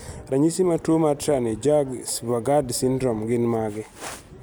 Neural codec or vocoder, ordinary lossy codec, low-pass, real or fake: none; none; none; real